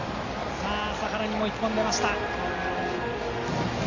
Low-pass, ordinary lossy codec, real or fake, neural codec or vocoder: 7.2 kHz; AAC, 32 kbps; real; none